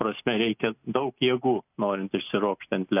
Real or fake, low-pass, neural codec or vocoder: real; 3.6 kHz; none